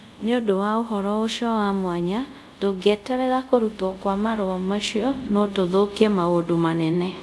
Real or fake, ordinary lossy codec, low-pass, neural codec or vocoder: fake; none; none; codec, 24 kHz, 0.5 kbps, DualCodec